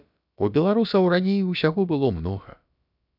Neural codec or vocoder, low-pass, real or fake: codec, 16 kHz, about 1 kbps, DyCAST, with the encoder's durations; 5.4 kHz; fake